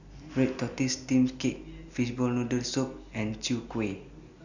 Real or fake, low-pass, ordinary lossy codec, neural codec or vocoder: real; 7.2 kHz; none; none